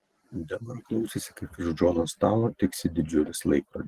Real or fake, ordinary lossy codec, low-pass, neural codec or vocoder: real; Opus, 16 kbps; 14.4 kHz; none